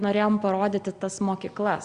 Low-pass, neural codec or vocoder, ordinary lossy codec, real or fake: 9.9 kHz; none; Opus, 64 kbps; real